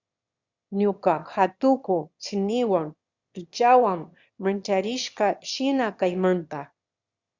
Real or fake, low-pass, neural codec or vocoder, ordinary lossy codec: fake; 7.2 kHz; autoencoder, 22.05 kHz, a latent of 192 numbers a frame, VITS, trained on one speaker; Opus, 64 kbps